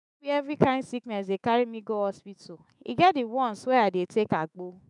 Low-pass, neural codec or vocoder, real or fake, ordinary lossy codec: 10.8 kHz; autoencoder, 48 kHz, 128 numbers a frame, DAC-VAE, trained on Japanese speech; fake; none